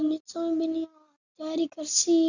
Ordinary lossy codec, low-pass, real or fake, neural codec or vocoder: none; 7.2 kHz; real; none